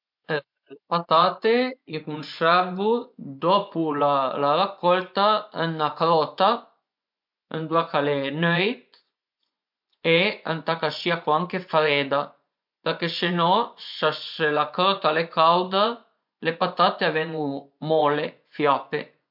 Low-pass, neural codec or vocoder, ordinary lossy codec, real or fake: 5.4 kHz; vocoder, 24 kHz, 100 mel bands, Vocos; MP3, 48 kbps; fake